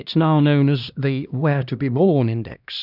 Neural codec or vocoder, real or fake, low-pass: codec, 16 kHz, 1 kbps, X-Codec, HuBERT features, trained on LibriSpeech; fake; 5.4 kHz